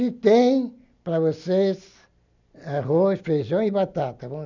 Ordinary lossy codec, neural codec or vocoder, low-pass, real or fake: none; none; 7.2 kHz; real